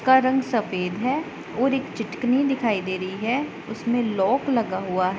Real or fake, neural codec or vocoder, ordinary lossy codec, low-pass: real; none; none; none